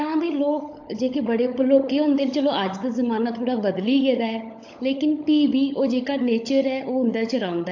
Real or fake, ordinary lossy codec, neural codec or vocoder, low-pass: fake; AAC, 48 kbps; codec, 16 kHz, 16 kbps, FunCodec, trained on LibriTTS, 50 frames a second; 7.2 kHz